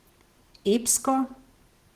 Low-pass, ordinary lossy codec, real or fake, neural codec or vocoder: 14.4 kHz; Opus, 16 kbps; real; none